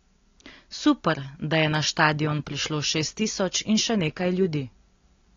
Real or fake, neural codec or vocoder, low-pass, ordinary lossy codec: real; none; 7.2 kHz; AAC, 32 kbps